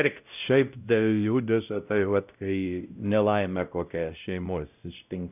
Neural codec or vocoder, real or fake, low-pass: codec, 16 kHz, 0.5 kbps, X-Codec, WavLM features, trained on Multilingual LibriSpeech; fake; 3.6 kHz